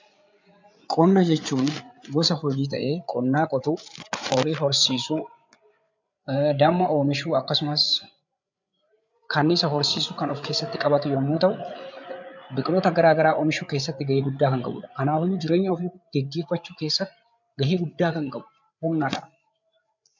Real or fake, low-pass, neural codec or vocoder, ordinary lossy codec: fake; 7.2 kHz; codec, 16 kHz, 8 kbps, FreqCodec, larger model; MP3, 64 kbps